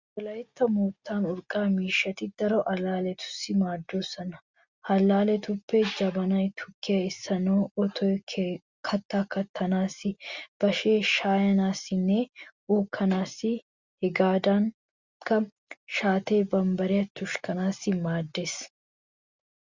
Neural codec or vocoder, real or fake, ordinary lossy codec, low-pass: none; real; Opus, 64 kbps; 7.2 kHz